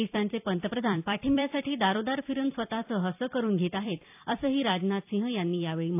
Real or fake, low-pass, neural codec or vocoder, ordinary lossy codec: fake; 3.6 kHz; vocoder, 44.1 kHz, 128 mel bands every 256 samples, BigVGAN v2; AAC, 32 kbps